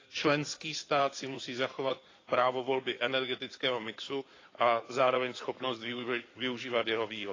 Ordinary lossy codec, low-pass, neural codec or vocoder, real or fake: AAC, 32 kbps; 7.2 kHz; codec, 16 kHz in and 24 kHz out, 2.2 kbps, FireRedTTS-2 codec; fake